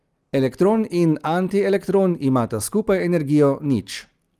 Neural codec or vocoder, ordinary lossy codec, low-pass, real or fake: none; Opus, 32 kbps; 14.4 kHz; real